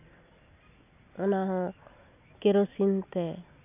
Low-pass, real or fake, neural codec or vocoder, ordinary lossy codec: 3.6 kHz; real; none; none